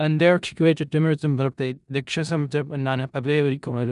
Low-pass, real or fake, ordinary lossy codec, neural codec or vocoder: 10.8 kHz; fake; none; codec, 16 kHz in and 24 kHz out, 0.4 kbps, LongCat-Audio-Codec, four codebook decoder